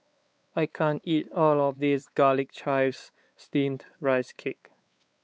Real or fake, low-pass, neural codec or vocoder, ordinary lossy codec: fake; none; codec, 16 kHz, 4 kbps, X-Codec, WavLM features, trained on Multilingual LibriSpeech; none